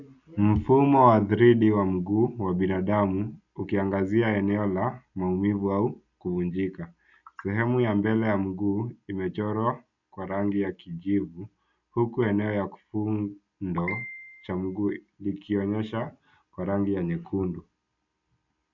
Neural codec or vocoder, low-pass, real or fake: none; 7.2 kHz; real